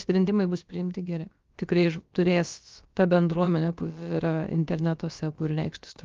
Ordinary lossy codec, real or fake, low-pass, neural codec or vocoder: Opus, 32 kbps; fake; 7.2 kHz; codec, 16 kHz, about 1 kbps, DyCAST, with the encoder's durations